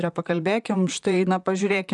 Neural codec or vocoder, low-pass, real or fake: vocoder, 44.1 kHz, 128 mel bands, Pupu-Vocoder; 10.8 kHz; fake